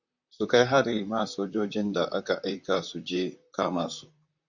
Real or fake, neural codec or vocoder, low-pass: fake; vocoder, 44.1 kHz, 128 mel bands, Pupu-Vocoder; 7.2 kHz